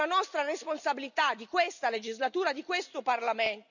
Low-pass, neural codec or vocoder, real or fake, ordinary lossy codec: 7.2 kHz; none; real; none